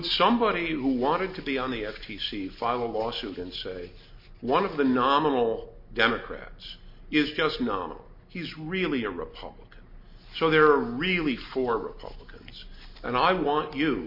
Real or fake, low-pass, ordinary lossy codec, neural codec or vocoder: real; 5.4 kHz; MP3, 32 kbps; none